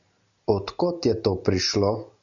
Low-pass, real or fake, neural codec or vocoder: 7.2 kHz; real; none